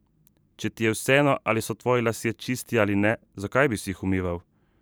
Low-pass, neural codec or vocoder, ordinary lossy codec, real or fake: none; vocoder, 44.1 kHz, 128 mel bands every 512 samples, BigVGAN v2; none; fake